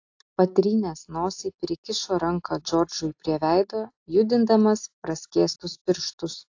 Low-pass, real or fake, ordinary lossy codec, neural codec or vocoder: 7.2 kHz; real; AAC, 48 kbps; none